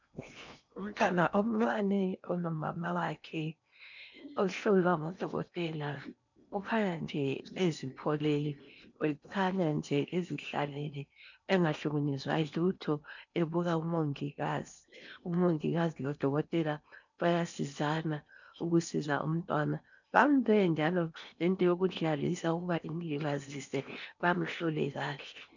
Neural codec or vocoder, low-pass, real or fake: codec, 16 kHz in and 24 kHz out, 0.8 kbps, FocalCodec, streaming, 65536 codes; 7.2 kHz; fake